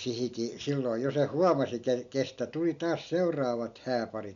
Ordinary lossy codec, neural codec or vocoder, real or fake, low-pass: none; none; real; 7.2 kHz